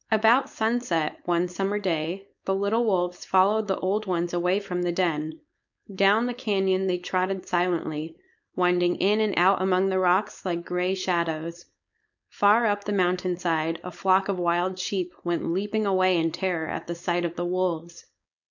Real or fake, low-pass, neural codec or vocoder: fake; 7.2 kHz; codec, 16 kHz, 4.8 kbps, FACodec